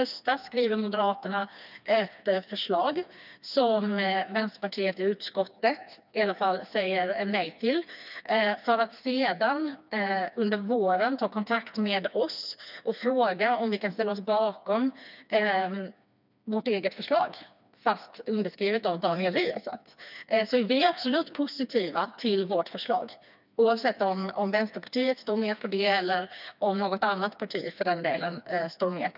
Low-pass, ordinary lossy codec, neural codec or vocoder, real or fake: 5.4 kHz; none; codec, 16 kHz, 2 kbps, FreqCodec, smaller model; fake